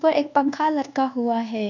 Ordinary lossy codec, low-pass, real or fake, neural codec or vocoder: none; 7.2 kHz; fake; codec, 24 kHz, 1.2 kbps, DualCodec